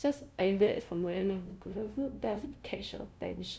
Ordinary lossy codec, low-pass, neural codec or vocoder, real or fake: none; none; codec, 16 kHz, 0.5 kbps, FunCodec, trained on LibriTTS, 25 frames a second; fake